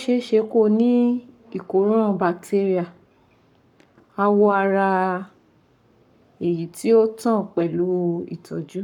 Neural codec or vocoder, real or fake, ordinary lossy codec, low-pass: codec, 44.1 kHz, 7.8 kbps, Pupu-Codec; fake; none; 19.8 kHz